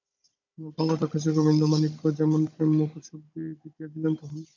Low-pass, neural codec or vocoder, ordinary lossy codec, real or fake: 7.2 kHz; codec, 16 kHz, 16 kbps, FunCodec, trained on Chinese and English, 50 frames a second; MP3, 64 kbps; fake